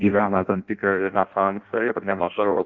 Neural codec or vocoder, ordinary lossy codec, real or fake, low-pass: codec, 16 kHz in and 24 kHz out, 0.6 kbps, FireRedTTS-2 codec; Opus, 24 kbps; fake; 7.2 kHz